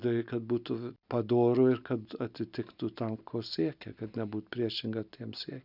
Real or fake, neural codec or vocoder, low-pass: real; none; 5.4 kHz